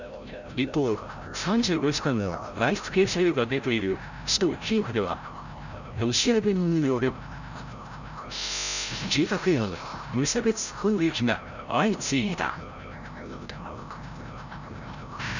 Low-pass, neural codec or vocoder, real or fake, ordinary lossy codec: 7.2 kHz; codec, 16 kHz, 0.5 kbps, FreqCodec, larger model; fake; none